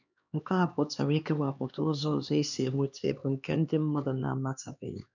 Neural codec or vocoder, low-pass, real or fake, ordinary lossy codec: codec, 16 kHz, 2 kbps, X-Codec, HuBERT features, trained on LibriSpeech; 7.2 kHz; fake; none